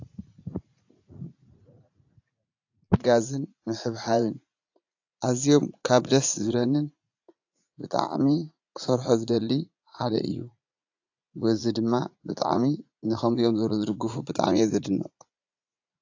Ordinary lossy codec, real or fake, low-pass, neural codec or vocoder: AAC, 48 kbps; fake; 7.2 kHz; vocoder, 44.1 kHz, 80 mel bands, Vocos